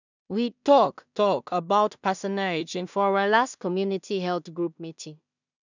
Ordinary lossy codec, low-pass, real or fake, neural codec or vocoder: none; 7.2 kHz; fake; codec, 16 kHz in and 24 kHz out, 0.4 kbps, LongCat-Audio-Codec, two codebook decoder